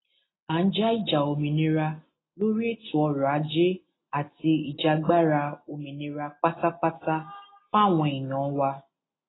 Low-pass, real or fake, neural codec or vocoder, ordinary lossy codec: 7.2 kHz; real; none; AAC, 16 kbps